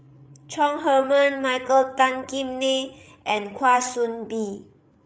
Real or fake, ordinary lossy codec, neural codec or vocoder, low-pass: fake; none; codec, 16 kHz, 8 kbps, FreqCodec, larger model; none